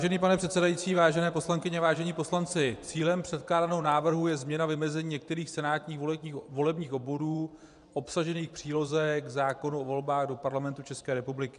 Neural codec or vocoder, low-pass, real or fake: vocoder, 24 kHz, 100 mel bands, Vocos; 10.8 kHz; fake